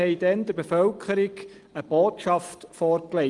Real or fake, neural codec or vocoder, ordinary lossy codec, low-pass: real; none; Opus, 24 kbps; 10.8 kHz